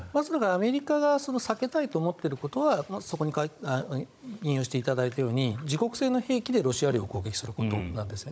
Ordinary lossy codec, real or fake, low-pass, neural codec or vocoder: none; fake; none; codec, 16 kHz, 16 kbps, FunCodec, trained on Chinese and English, 50 frames a second